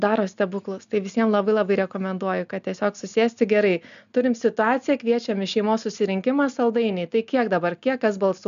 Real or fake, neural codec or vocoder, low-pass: real; none; 7.2 kHz